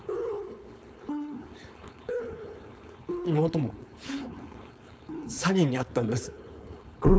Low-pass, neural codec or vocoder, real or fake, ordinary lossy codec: none; codec, 16 kHz, 4.8 kbps, FACodec; fake; none